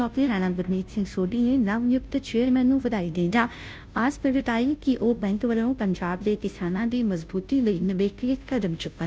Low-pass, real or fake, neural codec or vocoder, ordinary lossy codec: none; fake; codec, 16 kHz, 0.5 kbps, FunCodec, trained on Chinese and English, 25 frames a second; none